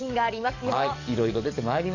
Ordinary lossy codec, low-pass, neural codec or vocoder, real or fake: none; 7.2 kHz; codec, 44.1 kHz, 7.8 kbps, DAC; fake